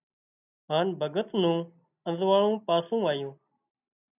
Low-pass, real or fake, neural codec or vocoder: 3.6 kHz; real; none